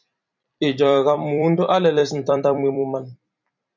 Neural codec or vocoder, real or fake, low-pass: vocoder, 44.1 kHz, 128 mel bands every 256 samples, BigVGAN v2; fake; 7.2 kHz